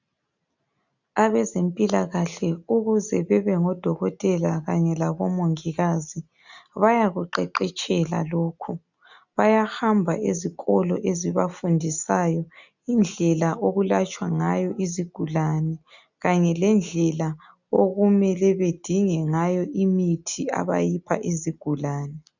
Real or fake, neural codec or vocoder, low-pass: real; none; 7.2 kHz